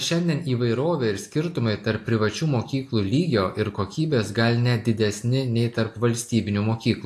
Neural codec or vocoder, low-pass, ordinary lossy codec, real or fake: none; 14.4 kHz; AAC, 64 kbps; real